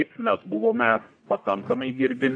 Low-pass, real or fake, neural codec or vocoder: 9.9 kHz; fake; codec, 44.1 kHz, 1.7 kbps, Pupu-Codec